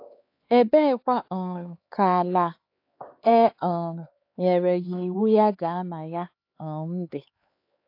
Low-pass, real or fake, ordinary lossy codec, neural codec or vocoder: 5.4 kHz; fake; AAC, 32 kbps; codec, 16 kHz, 4 kbps, X-Codec, HuBERT features, trained on LibriSpeech